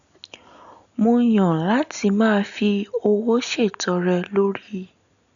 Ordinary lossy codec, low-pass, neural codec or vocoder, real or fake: none; 7.2 kHz; none; real